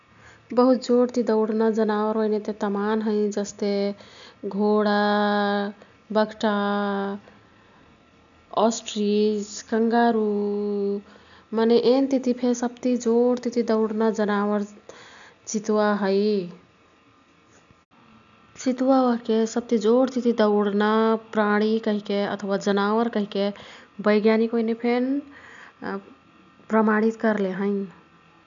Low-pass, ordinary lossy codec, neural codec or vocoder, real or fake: 7.2 kHz; none; none; real